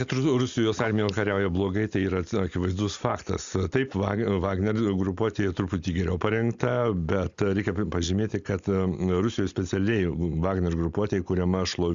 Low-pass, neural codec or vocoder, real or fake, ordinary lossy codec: 7.2 kHz; none; real; Opus, 64 kbps